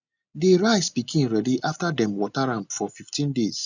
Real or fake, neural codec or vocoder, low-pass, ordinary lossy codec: real; none; 7.2 kHz; none